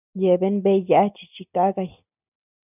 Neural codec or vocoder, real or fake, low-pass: none; real; 3.6 kHz